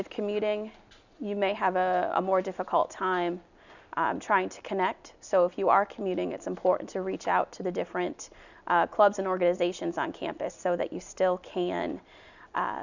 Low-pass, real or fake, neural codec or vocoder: 7.2 kHz; real; none